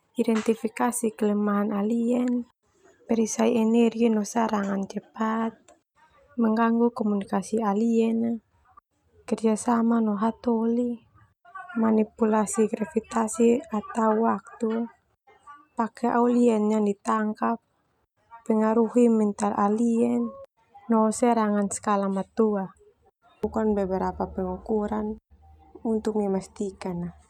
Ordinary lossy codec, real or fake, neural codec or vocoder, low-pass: none; real; none; 19.8 kHz